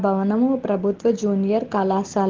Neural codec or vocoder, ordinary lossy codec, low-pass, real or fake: none; Opus, 16 kbps; 7.2 kHz; real